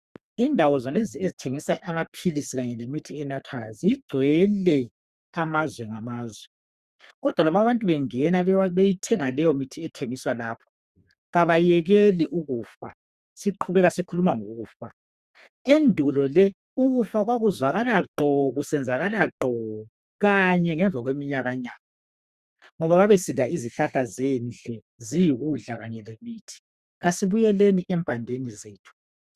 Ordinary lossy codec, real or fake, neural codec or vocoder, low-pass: Opus, 64 kbps; fake; codec, 32 kHz, 1.9 kbps, SNAC; 14.4 kHz